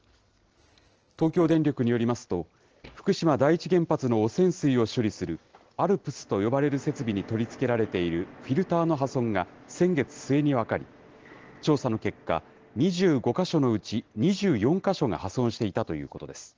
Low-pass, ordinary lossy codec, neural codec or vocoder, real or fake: 7.2 kHz; Opus, 16 kbps; none; real